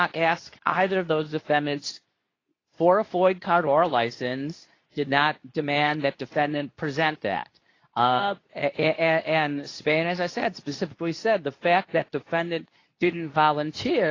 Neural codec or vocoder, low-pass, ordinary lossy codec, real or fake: codec, 24 kHz, 0.9 kbps, WavTokenizer, medium speech release version 2; 7.2 kHz; AAC, 32 kbps; fake